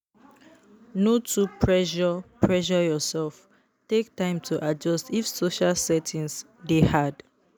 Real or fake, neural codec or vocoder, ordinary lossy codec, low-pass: real; none; none; none